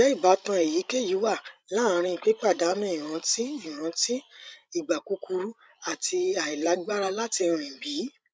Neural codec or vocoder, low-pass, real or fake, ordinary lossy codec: codec, 16 kHz, 16 kbps, FreqCodec, larger model; none; fake; none